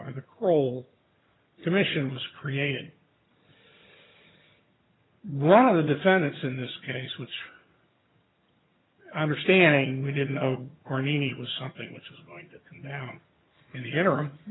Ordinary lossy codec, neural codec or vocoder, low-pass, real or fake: AAC, 16 kbps; vocoder, 22.05 kHz, 80 mel bands, HiFi-GAN; 7.2 kHz; fake